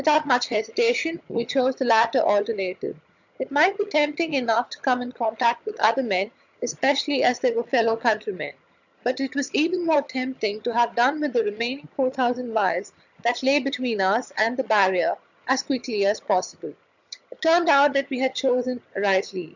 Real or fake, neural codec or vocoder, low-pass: fake; vocoder, 22.05 kHz, 80 mel bands, HiFi-GAN; 7.2 kHz